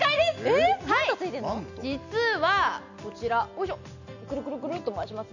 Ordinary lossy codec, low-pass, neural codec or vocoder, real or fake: none; 7.2 kHz; none; real